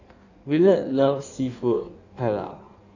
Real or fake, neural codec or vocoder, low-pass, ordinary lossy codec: fake; codec, 16 kHz in and 24 kHz out, 1.1 kbps, FireRedTTS-2 codec; 7.2 kHz; none